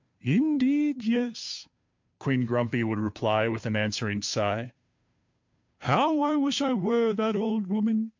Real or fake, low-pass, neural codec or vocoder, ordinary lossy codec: fake; 7.2 kHz; codec, 16 kHz, 2 kbps, FunCodec, trained on Chinese and English, 25 frames a second; MP3, 48 kbps